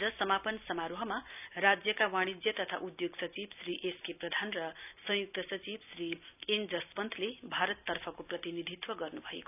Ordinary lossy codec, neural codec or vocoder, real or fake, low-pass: none; none; real; 3.6 kHz